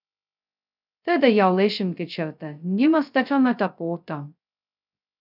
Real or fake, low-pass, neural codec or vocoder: fake; 5.4 kHz; codec, 16 kHz, 0.2 kbps, FocalCodec